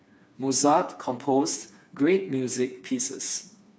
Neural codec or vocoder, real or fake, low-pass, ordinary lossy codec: codec, 16 kHz, 4 kbps, FreqCodec, smaller model; fake; none; none